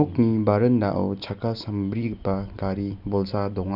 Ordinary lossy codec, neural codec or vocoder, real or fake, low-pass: none; none; real; 5.4 kHz